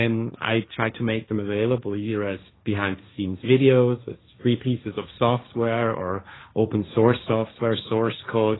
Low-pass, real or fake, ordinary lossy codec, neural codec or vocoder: 7.2 kHz; fake; AAC, 16 kbps; codec, 16 kHz, 1.1 kbps, Voila-Tokenizer